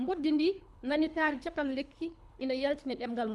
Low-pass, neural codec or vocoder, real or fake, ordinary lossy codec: none; codec, 24 kHz, 3 kbps, HILCodec; fake; none